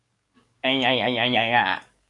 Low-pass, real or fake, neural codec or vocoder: 10.8 kHz; fake; codec, 44.1 kHz, 7.8 kbps, DAC